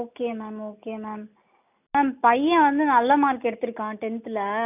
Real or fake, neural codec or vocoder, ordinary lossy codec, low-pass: real; none; none; 3.6 kHz